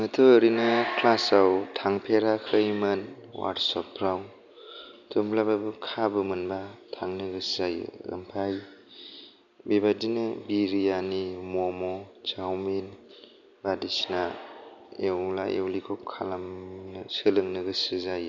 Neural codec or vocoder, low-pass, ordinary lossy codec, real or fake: none; 7.2 kHz; none; real